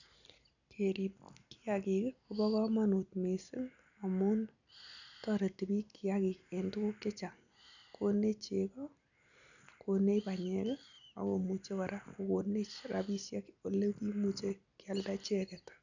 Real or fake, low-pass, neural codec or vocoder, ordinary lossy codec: real; 7.2 kHz; none; none